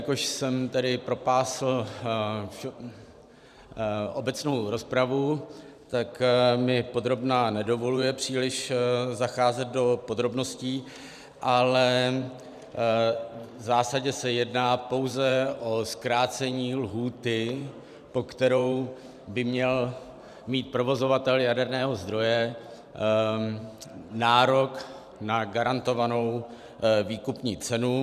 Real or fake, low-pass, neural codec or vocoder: fake; 14.4 kHz; vocoder, 44.1 kHz, 128 mel bands every 512 samples, BigVGAN v2